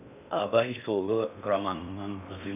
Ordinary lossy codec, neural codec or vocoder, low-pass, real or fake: none; codec, 16 kHz in and 24 kHz out, 0.6 kbps, FocalCodec, streaming, 2048 codes; 3.6 kHz; fake